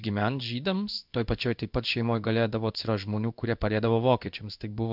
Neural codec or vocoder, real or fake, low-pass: codec, 16 kHz in and 24 kHz out, 1 kbps, XY-Tokenizer; fake; 5.4 kHz